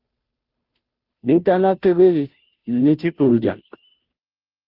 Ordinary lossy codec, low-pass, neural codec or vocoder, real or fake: Opus, 24 kbps; 5.4 kHz; codec, 16 kHz, 0.5 kbps, FunCodec, trained on Chinese and English, 25 frames a second; fake